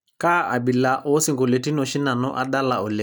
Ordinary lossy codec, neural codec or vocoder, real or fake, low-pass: none; none; real; none